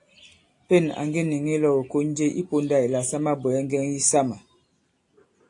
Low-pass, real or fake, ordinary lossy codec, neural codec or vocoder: 10.8 kHz; real; AAC, 48 kbps; none